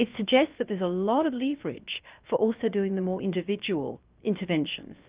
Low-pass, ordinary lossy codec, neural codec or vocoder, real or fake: 3.6 kHz; Opus, 24 kbps; codec, 16 kHz, 0.7 kbps, FocalCodec; fake